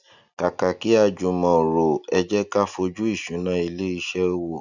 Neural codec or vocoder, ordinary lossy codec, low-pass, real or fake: none; none; 7.2 kHz; real